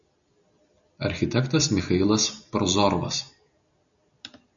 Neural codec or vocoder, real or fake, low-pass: none; real; 7.2 kHz